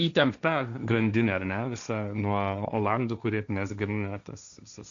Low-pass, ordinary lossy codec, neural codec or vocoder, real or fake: 7.2 kHz; MP3, 96 kbps; codec, 16 kHz, 1.1 kbps, Voila-Tokenizer; fake